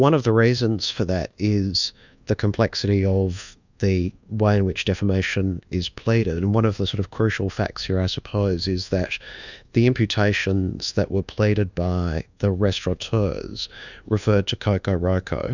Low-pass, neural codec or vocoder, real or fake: 7.2 kHz; codec, 24 kHz, 1.2 kbps, DualCodec; fake